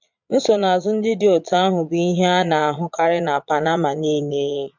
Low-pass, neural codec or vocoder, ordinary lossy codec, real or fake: 7.2 kHz; vocoder, 22.05 kHz, 80 mel bands, Vocos; MP3, 64 kbps; fake